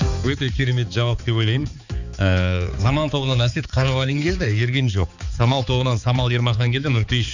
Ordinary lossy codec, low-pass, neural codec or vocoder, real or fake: none; 7.2 kHz; codec, 16 kHz, 4 kbps, X-Codec, HuBERT features, trained on balanced general audio; fake